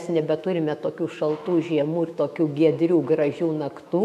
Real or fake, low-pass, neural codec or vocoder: fake; 14.4 kHz; autoencoder, 48 kHz, 128 numbers a frame, DAC-VAE, trained on Japanese speech